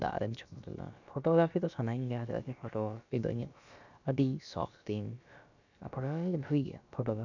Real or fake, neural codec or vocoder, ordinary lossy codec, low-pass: fake; codec, 16 kHz, about 1 kbps, DyCAST, with the encoder's durations; none; 7.2 kHz